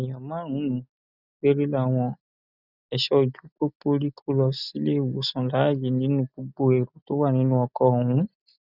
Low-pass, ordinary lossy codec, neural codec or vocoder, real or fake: 5.4 kHz; none; none; real